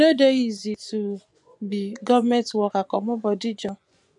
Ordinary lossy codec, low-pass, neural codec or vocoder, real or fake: none; 10.8 kHz; vocoder, 24 kHz, 100 mel bands, Vocos; fake